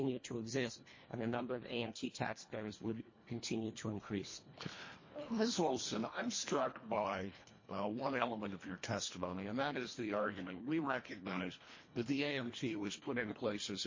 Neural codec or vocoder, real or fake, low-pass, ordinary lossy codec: codec, 24 kHz, 1.5 kbps, HILCodec; fake; 7.2 kHz; MP3, 32 kbps